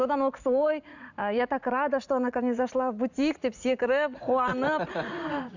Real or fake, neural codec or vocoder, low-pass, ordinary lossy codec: fake; vocoder, 44.1 kHz, 80 mel bands, Vocos; 7.2 kHz; none